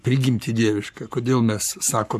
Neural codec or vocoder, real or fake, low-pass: codec, 44.1 kHz, 7.8 kbps, Pupu-Codec; fake; 14.4 kHz